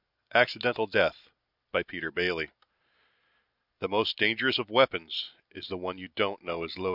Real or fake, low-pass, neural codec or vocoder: real; 5.4 kHz; none